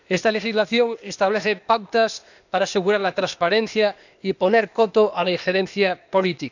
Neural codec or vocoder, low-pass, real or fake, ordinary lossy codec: codec, 16 kHz, 0.8 kbps, ZipCodec; 7.2 kHz; fake; none